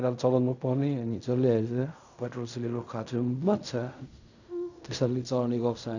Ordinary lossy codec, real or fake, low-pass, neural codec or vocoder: none; fake; 7.2 kHz; codec, 16 kHz in and 24 kHz out, 0.4 kbps, LongCat-Audio-Codec, fine tuned four codebook decoder